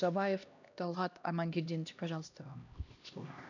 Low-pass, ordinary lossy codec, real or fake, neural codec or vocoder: 7.2 kHz; none; fake; codec, 16 kHz, 1 kbps, X-Codec, HuBERT features, trained on LibriSpeech